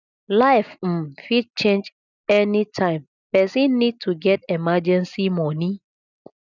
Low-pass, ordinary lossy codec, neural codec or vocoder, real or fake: 7.2 kHz; none; none; real